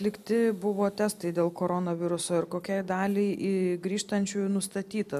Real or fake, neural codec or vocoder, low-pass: real; none; 14.4 kHz